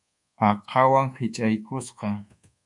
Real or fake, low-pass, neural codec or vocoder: fake; 10.8 kHz; codec, 24 kHz, 1.2 kbps, DualCodec